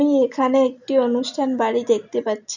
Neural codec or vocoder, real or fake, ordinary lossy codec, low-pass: none; real; none; 7.2 kHz